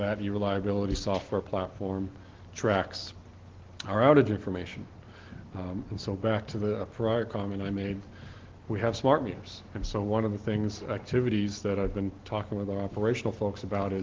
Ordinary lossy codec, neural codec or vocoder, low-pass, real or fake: Opus, 16 kbps; none; 7.2 kHz; real